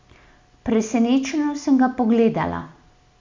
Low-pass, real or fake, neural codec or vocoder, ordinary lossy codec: 7.2 kHz; real; none; MP3, 64 kbps